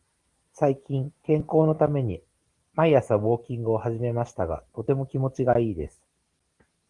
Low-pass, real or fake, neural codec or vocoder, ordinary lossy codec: 10.8 kHz; real; none; Opus, 24 kbps